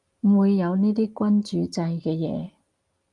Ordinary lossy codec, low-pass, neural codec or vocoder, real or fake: Opus, 32 kbps; 10.8 kHz; none; real